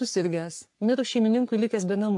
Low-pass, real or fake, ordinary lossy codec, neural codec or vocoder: 10.8 kHz; fake; AAC, 64 kbps; codec, 44.1 kHz, 3.4 kbps, Pupu-Codec